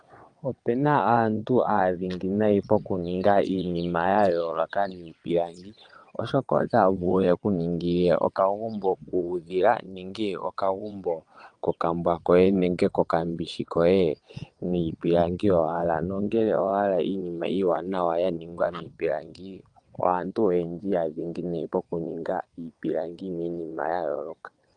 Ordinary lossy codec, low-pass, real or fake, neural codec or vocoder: Opus, 32 kbps; 9.9 kHz; fake; vocoder, 22.05 kHz, 80 mel bands, WaveNeXt